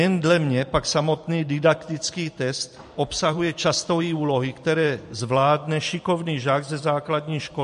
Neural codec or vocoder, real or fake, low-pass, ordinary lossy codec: vocoder, 44.1 kHz, 128 mel bands every 512 samples, BigVGAN v2; fake; 14.4 kHz; MP3, 48 kbps